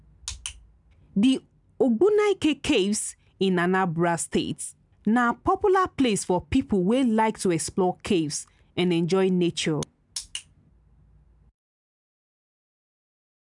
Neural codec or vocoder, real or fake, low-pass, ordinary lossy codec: none; real; 10.8 kHz; none